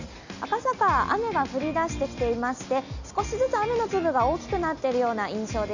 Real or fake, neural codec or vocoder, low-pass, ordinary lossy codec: real; none; 7.2 kHz; none